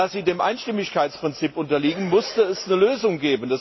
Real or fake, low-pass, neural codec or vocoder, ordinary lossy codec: real; 7.2 kHz; none; MP3, 24 kbps